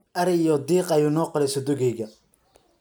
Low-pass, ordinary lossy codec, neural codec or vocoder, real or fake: none; none; none; real